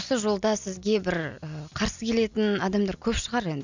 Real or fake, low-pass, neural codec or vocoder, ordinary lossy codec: real; 7.2 kHz; none; none